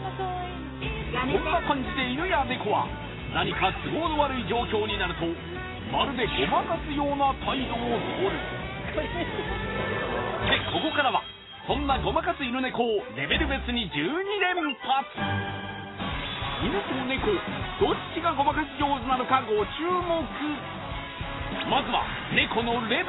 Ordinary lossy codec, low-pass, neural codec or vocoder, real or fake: AAC, 16 kbps; 7.2 kHz; none; real